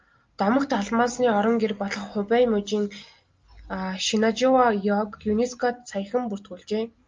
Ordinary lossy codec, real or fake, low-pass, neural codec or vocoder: Opus, 24 kbps; real; 7.2 kHz; none